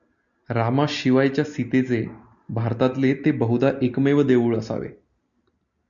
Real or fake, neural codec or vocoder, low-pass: real; none; 7.2 kHz